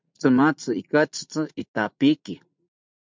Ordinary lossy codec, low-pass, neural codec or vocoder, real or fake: MP3, 64 kbps; 7.2 kHz; vocoder, 44.1 kHz, 80 mel bands, Vocos; fake